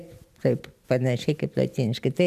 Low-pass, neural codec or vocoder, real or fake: 14.4 kHz; none; real